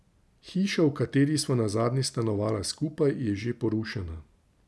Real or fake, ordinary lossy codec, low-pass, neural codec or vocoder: real; none; none; none